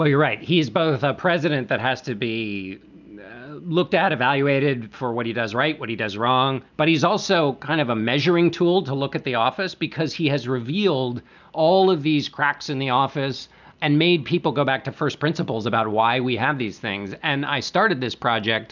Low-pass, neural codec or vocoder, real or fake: 7.2 kHz; none; real